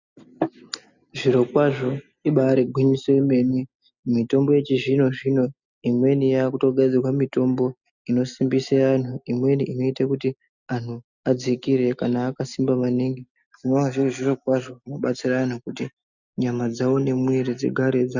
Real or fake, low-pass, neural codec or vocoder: real; 7.2 kHz; none